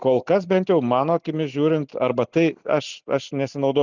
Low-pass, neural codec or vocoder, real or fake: 7.2 kHz; none; real